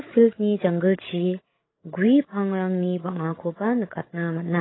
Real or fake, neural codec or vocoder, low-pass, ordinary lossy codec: fake; codec, 16 kHz, 16 kbps, FreqCodec, larger model; 7.2 kHz; AAC, 16 kbps